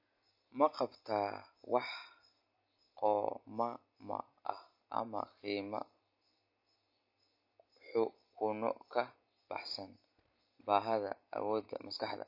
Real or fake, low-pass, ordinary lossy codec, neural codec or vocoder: real; 5.4 kHz; MP3, 32 kbps; none